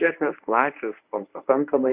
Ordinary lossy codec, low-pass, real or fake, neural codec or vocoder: Opus, 64 kbps; 3.6 kHz; fake; codec, 24 kHz, 0.9 kbps, WavTokenizer, medium speech release version 1